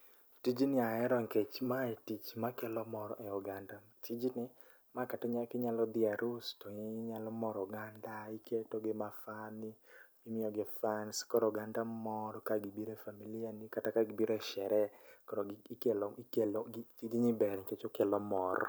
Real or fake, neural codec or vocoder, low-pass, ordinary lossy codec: real; none; none; none